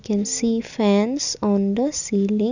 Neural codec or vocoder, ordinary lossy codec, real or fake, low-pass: none; none; real; 7.2 kHz